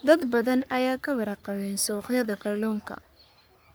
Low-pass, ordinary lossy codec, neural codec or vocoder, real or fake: none; none; codec, 44.1 kHz, 3.4 kbps, Pupu-Codec; fake